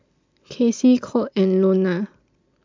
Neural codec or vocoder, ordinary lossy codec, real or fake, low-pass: none; MP3, 64 kbps; real; 7.2 kHz